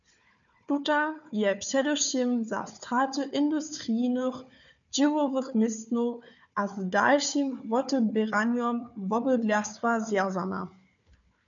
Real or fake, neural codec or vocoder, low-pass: fake; codec, 16 kHz, 4 kbps, FunCodec, trained on Chinese and English, 50 frames a second; 7.2 kHz